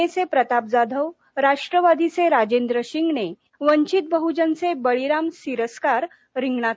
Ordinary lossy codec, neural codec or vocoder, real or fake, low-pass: none; none; real; none